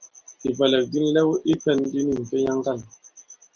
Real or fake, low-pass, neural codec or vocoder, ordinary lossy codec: real; 7.2 kHz; none; Opus, 32 kbps